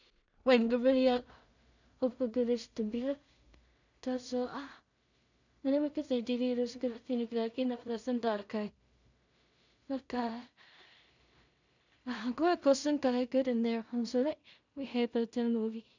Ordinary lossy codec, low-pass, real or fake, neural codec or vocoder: none; 7.2 kHz; fake; codec, 16 kHz in and 24 kHz out, 0.4 kbps, LongCat-Audio-Codec, two codebook decoder